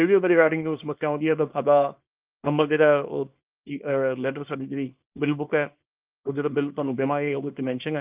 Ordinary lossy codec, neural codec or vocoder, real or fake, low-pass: Opus, 32 kbps; codec, 24 kHz, 0.9 kbps, WavTokenizer, small release; fake; 3.6 kHz